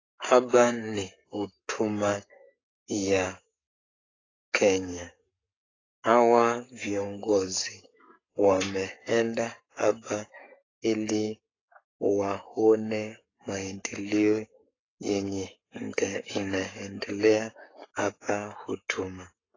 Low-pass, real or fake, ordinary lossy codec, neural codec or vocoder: 7.2 kHz; fake; AAC, 32 kbps; codec, 44.1 kHz, 7.8 kbps, Pupu-Codec